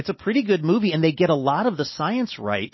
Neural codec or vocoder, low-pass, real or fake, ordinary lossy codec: none; 7.2 kHz; real; MP3, 24 kbps